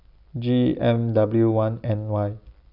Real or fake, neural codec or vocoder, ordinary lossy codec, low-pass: real; none; none; 5.4 kHz